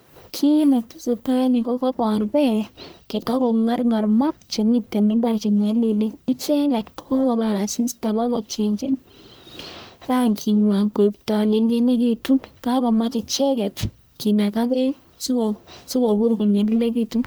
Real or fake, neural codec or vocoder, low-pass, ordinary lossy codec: fake; codec, 44.1 kHz, 1.7 kbps, Pupu-Codec; none; none